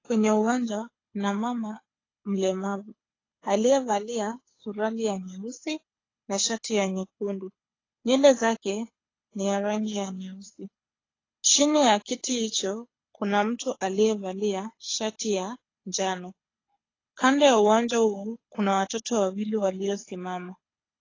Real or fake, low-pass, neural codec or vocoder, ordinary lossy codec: fake; 7.2 kHz; codec, 24 kHz, 6 kbps, HILCodec; AAC, 32 kbps